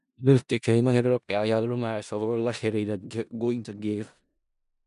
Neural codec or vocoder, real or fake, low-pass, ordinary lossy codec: codec, 16 kHz in and 24 kHz out, 0.4 kbps, LongCat-Audio-Codec, four codebook decoder; fake; 10.8 kHz; none